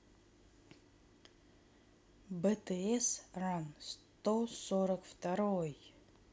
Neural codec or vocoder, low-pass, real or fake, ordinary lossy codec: none; none; real; none